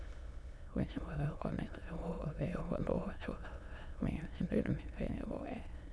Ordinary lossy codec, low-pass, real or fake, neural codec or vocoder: none; none; fake; autoencoder, 22.05 kHz, a latent of 192 numbers a frame, VITS, trained on many speakers